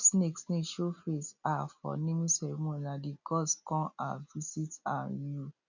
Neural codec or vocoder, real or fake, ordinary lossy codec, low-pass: none; real; none; 7.2 kHz